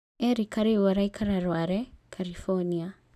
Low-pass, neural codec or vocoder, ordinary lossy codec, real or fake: 14.4 kHz; none; none; real